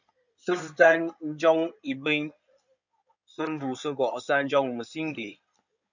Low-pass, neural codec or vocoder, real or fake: 7.2 kHz; codec, 16 kHz in and 24 kHz out, 2.2 kbps, FireRedTTS-2 codec; fake